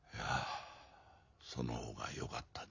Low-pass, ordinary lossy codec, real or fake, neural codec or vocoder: 7.2 kHz; none; real; none